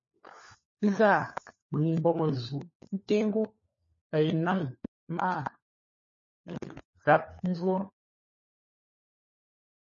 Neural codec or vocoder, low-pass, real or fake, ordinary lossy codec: codec, 16 kHz, 4 kbps, FunCodec, trained on LibriTTS, 50 frames a second; 7.2 kHz; fake; MP3, 32 kbps